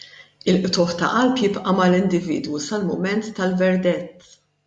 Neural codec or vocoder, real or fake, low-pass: none; real; 10.8 kHz